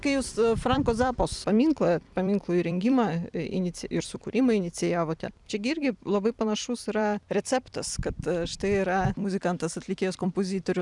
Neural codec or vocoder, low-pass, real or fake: vocoder, 44.1 kHz, 128 mel bands every 256 samples, BigVGAN v2; 10.8 kHz; fake